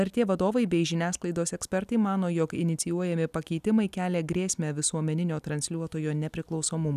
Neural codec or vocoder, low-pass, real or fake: none; 14.4 kHz; real